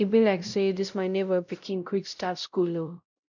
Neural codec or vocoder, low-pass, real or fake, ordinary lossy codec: codec, 16 kHz, 0.5 kbps, X-Codec, WavLM features, trained on Multilingual LibriSpeech; 7.2 kHz; fake; none